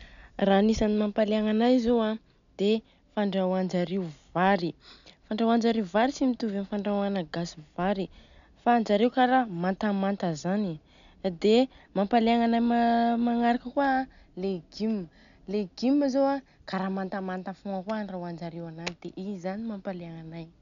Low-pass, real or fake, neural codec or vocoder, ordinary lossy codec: 7.2 kHz; real; none; none